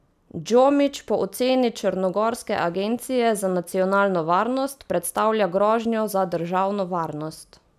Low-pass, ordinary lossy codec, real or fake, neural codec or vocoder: 14.4 kHz; none; real; none